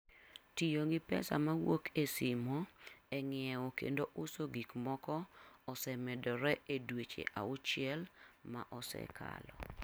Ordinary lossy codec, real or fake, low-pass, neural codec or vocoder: none; real; none; none